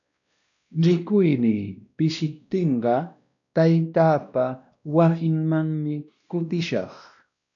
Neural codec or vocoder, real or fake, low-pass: codec, 16 kHz, 1 kbps, X-Codec, WavLM features, trained on Multilingual LibriSpeech; fake; 7.2 kHz